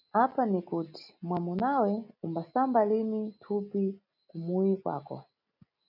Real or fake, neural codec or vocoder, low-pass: real; none; 5.4 kHz